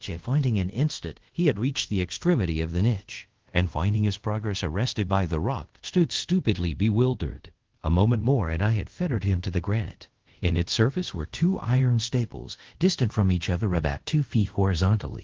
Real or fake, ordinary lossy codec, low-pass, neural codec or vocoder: fake; Opus, 16 kbps; 7.2 kHz; codec, 24 kHz, 0.5 kbps, DualCodec